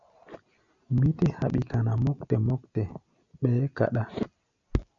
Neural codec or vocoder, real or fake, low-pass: none; real; 7.2 kHz